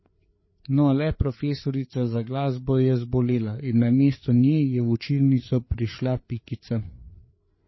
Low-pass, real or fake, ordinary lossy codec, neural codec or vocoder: 7.2 kHz; fake; MP3, 24 kbps; codec, 16 kHz, 4 kbps, FreqCodec, larger model